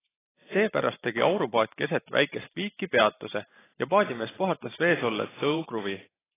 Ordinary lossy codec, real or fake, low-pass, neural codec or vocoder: AAC, 16 kbps; fake; 3.6 kHz; vocoder, 44.1 kHz, 128 mel bands every 512 samples, BigVGAN v2